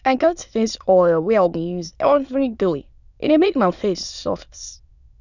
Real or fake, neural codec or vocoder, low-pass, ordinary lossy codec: fake; autoencoder, 22.05 kHz, a latent of 192 numbers a frame, VITS, trained on many speakers; 7.2 kHz; none